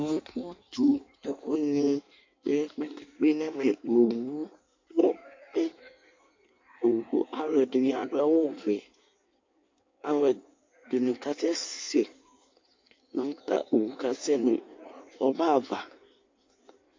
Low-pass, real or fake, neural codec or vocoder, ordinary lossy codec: 7.2 kHz; fake; codec, 16 kHz in and 24 kHz out, 1.1 kbps, FireRedTTS-2 codec; MP3, 48 kbps